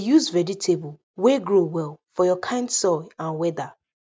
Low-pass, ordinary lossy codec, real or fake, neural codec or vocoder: none; none; real; none